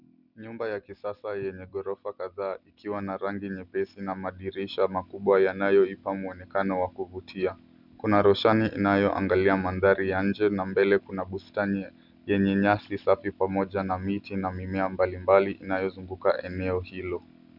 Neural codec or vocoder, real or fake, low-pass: none; real; 5.4 kHz